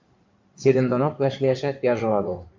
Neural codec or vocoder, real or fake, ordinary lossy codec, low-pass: codec, 16 kHz in and 24 kHz out, 2.2 kbps, FireRedTTS-2 codec; fake; MP3, 64 kbps; 7.2 kHz